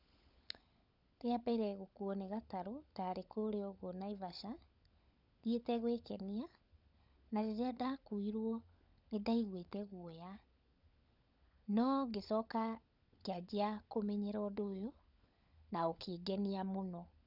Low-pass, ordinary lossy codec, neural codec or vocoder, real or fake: 5.4 kHz; none; codec, 16 kHz, 16 kbps, FunCodec, trained on LibriTTS, 50 frames a second; fake